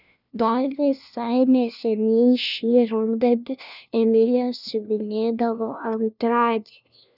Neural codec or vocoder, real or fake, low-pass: codec, 16 kHz, 1 kbps, FunCodec, trained on LibriTTS, 50 frames a second; fake; 5.4 kHz